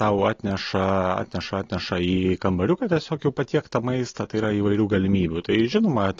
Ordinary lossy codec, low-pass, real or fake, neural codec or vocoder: AAC, 32 kbps; 7.2 kHz; real; none